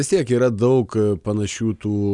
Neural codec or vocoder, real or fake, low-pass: none; real; 10.8 kHz